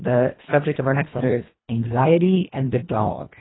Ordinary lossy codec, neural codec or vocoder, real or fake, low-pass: AAC, 16 kbps; codec, 24 kHz, 1.5 kbps, HILCodec; fake; 7.2 kHz